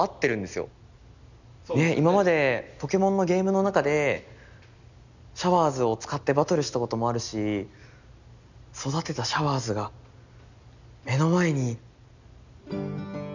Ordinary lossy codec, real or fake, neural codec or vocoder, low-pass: none; real; none; 7.2 kHz